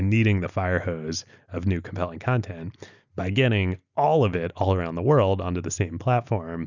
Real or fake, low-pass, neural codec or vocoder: real; 7.2 kHz; none